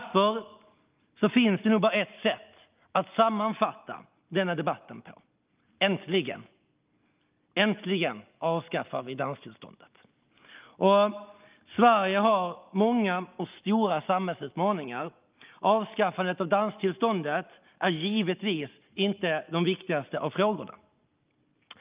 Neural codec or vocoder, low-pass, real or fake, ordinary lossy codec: none; 3.6 kHz; real; Opus, 24 kbps